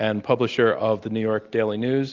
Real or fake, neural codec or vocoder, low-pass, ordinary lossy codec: real; none; 7.2 kHz; Opus, 32 kbps